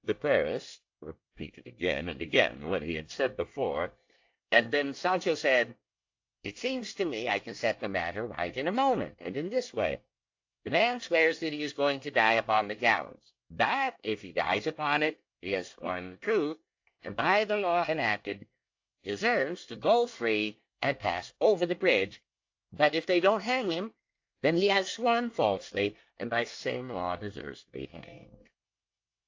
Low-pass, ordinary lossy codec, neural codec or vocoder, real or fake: 7.2 kHz; AAC, 48 kbps; codec, 24 kHz, 1 kbps, SNAC; fake